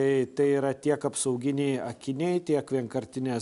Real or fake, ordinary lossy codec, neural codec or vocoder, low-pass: real; MP3, 96 kbps; none; 10.8 kHz